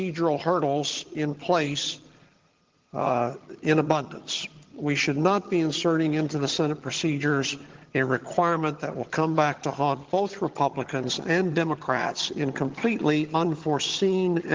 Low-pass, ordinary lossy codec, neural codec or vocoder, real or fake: 7.2 kHz; Opus, 16 kbps; vocoder, 22.05 kHz, 80 mel bands, HiFi-GAN; fake